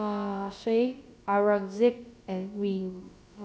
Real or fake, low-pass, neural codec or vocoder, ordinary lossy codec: fake; none; codec, 16 kHz, about 1 kbps, DyCAST, with the encoder's durations; none